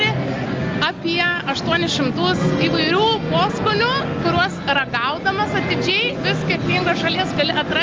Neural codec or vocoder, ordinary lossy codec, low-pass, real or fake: none; Opus, 32 kbps; 7.2 kHz; real